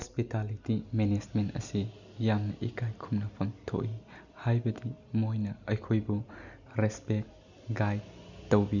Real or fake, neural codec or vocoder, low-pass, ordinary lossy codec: real; none; 7.2 kHz; none